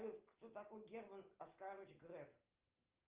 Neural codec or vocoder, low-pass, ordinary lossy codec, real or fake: none; 3.6 kHz; Opus, 24 kbps; real